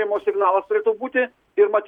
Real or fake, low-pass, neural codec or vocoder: real; 19.8 kHz; none